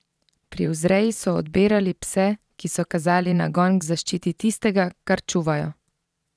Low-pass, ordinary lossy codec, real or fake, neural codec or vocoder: none; none; fake; vocoder, 22.05 kHz, 80 mel bands, Vocos